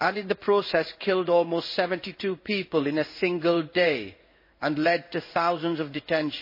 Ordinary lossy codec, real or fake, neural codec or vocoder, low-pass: MP3, 24 kbps; fake; codec, 16 kHz in and 24 kHz out, 1 kbps, XY-Tokenizer; 5.4 kHz